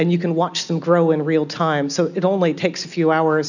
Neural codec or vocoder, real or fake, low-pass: none; real; 7.2 kHz